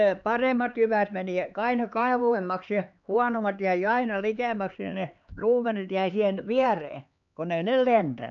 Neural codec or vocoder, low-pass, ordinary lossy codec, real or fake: codec, 16 kHz, 4 kbps, X-Codec, HuBERT features, trained on LibriSpeech; 7.2 kHz; none; fake